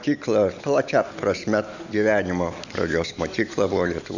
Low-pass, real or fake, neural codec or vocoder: 7.2 kHz; real; none